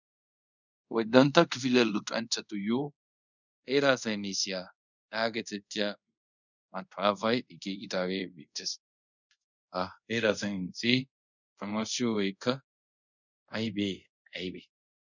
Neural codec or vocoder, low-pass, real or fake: codec, 24 kHz, 0.5 kbps, DualCodec; 7.2 kHz; fake